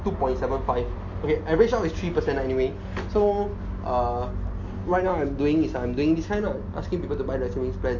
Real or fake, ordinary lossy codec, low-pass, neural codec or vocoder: real; MP3, 48 kbps; 7.2 kHz; none